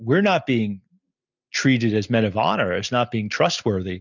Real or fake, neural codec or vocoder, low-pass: real; none; 7.2 kHz